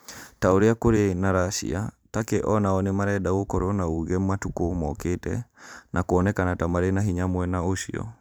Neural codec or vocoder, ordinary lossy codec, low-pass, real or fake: vocoder, 44.1 kHz, 128 mel bands every 512 samples, BigVGAN v2; none; none; fake